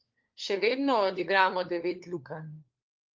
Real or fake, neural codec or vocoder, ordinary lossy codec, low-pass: fake; codec, 16 kHz, 4 kbps, FunCodec, trained on LibriTTS, 50 frames a second; Opus, 32 kbps; 7.2 kHz